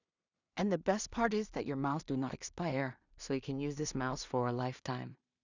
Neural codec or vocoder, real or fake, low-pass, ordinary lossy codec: codec, 16 kHz in and 24 kHz out, 0.4 kbps, LongCat-Audio-Codec, two codebook decoder; fake; 7.2 kHz; none